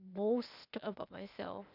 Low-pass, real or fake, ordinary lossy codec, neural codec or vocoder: 5.4 kHz; fake; none; codec, 16 kHz, 0.8 kbps, ZipCodec